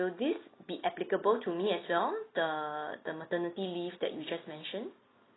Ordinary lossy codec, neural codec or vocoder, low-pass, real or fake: AAC, 16 kbps; none; 7.2 kHz; real